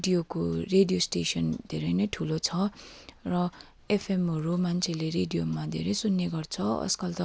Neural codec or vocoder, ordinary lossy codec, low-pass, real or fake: none; none; none; real